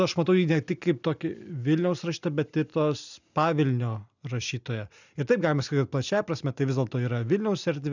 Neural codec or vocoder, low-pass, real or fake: none; 7.2 kHz; real